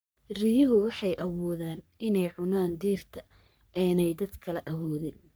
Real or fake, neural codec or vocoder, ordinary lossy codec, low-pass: fake; codec, 44.1 kHz, 3.4 kbps, Pupu-Codec; none; none